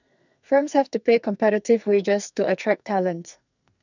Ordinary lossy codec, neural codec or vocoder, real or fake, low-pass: none; codec, 44.1 kHz, 2.6 kbps, SNAC; fake; 7.2 kHz